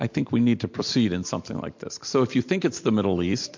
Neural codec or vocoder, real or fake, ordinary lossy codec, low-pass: none; real; MP3, 48 kbps; 7.2 kHz